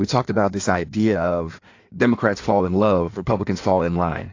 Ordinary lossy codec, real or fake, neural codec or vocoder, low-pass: AAC, 48 kbps; fake; codec, 16 kHz in and 24 kHz out, 1.1 kbps, FireRedTTS-2 codec; 7.2 kHz